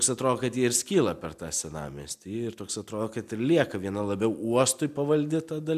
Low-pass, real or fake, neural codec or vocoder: 14.4 kHz; real; none